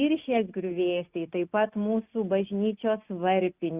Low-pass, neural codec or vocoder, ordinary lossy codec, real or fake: 3.6 kHz; none; Opus, 64 kbps; real